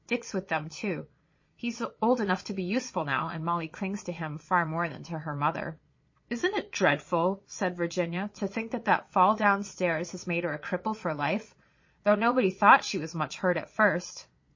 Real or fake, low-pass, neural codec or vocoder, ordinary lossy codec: fake; 7.2 kHz; vocoder, 22.05 kHz, 80 mel bands, Vocos; MP3, 32 kbps